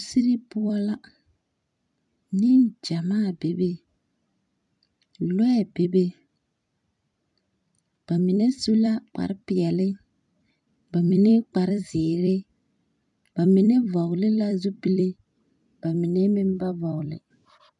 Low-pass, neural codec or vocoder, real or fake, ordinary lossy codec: 10.8 kHz; vocoder, 44.1 kHz, 128 mel bands every 256 samples, BigVGAN v2; fake; MP3, 96 kbps